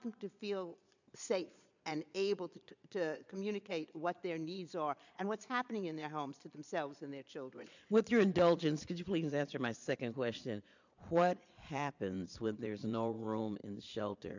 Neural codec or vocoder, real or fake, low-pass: vocoder, 22.05 kHz, 80 mel bands, Vocos; fake; 7.2 kHz